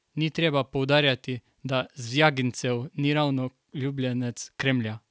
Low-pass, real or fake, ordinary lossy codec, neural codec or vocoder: none; real; none; none